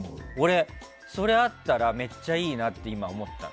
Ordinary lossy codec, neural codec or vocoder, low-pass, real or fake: none; none; none; real